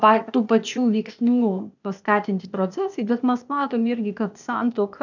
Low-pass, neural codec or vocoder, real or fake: 7.2 kHz; codec, 16 kHz, 0.8 kbps, ZipCodec; fake